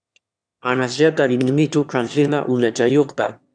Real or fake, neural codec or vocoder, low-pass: fake; autoencoder, 22.05 kHz, a latent of 192 numbers a frame, VITS, trained on one speaker; 9.9 kHz